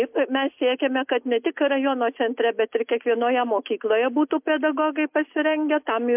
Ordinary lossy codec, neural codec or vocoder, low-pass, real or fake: MP3, 32 kbps; none; 3.6 kHz; real